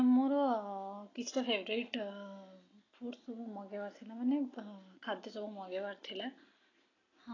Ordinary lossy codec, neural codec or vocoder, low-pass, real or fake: AAC, 32 kbps; none; 7.2 kHz; real